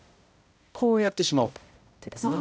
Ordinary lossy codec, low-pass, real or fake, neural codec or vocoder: none; none; fake; codec, 16 kHz, 0.5 kbps, X-Codec, HuBERT features, trained on balanced general audio